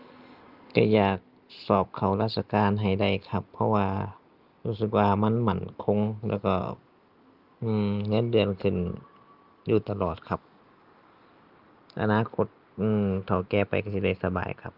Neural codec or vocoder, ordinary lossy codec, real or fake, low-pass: none; Opus, 24 kbps; real; 5.4 kHz